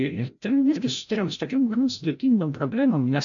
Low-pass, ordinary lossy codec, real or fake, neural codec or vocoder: 7.2 kHz; MP3, 64 kbps; fake; codec, 16 kHz, 0.5 kbps, FreqCodec, larger model